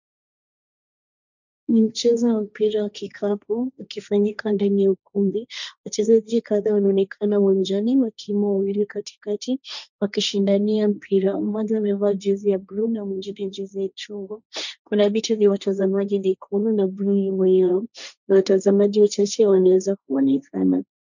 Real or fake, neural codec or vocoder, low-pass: fake; codec, 16 kHz, 1.1 kbps, Voila-Tokenizer; 7.2 kHz